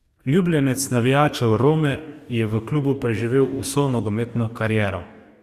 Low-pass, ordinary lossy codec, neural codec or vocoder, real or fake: 14.4 kHz; Opus, 64 kbps; codec, 44.1 kHz, 2.6 kbps, DAC; fake